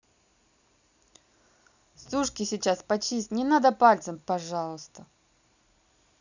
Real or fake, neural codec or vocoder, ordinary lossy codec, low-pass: real; none; none; 7.2 kHz